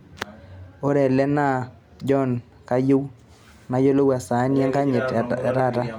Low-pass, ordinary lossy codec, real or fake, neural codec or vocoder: 19.8 kHz; none; real; none